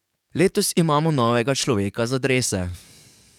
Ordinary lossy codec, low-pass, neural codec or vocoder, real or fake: none; 19.8 kHz; codec, 44.1 kHz, 7.8 kbps, DAC; fake